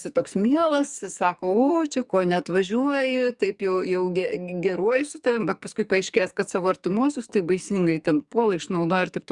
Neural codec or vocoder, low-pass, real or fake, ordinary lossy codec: codec, 44.1 kHz, 2.6 kbps, SNAC; 10.8 kHz; fake; Opus, 64 kbps